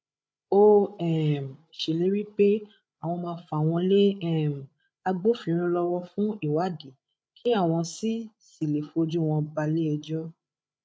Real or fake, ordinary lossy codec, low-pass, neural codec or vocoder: fake; none; none; codec, 16 kHz, 8 kbps, FreqCodec, larger model